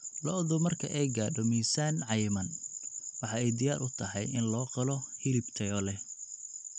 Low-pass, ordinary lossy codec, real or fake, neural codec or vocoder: 9.9 kHz; none; real; none